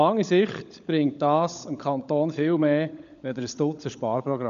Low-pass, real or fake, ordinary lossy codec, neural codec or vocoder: 7.2 kHz; fake; none; codec, 16 kHz, 16 kbps, FunCodec, trained on LibriTTS, 50 frames a second